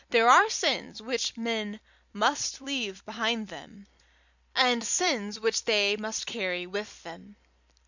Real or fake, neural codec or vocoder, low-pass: fake; vocoder, 44.1 kHz, 128 mel bands every 256 samples, BigVGAN v2; 7.2 kHz